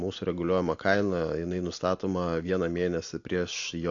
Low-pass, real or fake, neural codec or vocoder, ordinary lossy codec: 7.2 kHz; real; none; AAC, 48 kbps